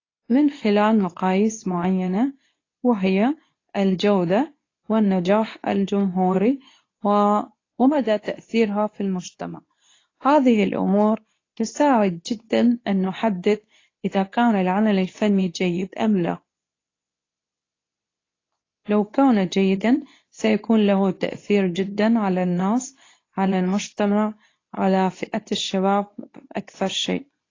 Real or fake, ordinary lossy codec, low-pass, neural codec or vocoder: fake; AAC, 32 kbps; 7.2 kHz; codec, 24 kHz, 0.9 kbps, WavTokenizer, medium speech release version 2